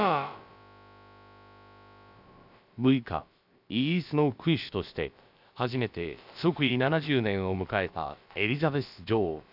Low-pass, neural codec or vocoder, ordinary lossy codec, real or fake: 5.4 kHz; codec, 16 kHz, about 1 kbps, DyCAST, with the encoder's durations; none; fake